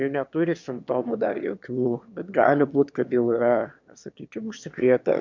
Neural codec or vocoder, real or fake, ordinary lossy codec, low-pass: autoencoder, 22.05 kHz, a latent of 192 numbers a frame, VITS, trained on one speaker; fake; MP3, 64 kbps; 7.2 kHz